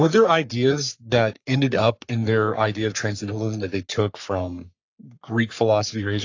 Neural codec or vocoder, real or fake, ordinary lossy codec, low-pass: codec, 44.1 kHz, 3.4 kbps, Pupu-Codec; fake; AAC, 48 kbps; 7.2 kHz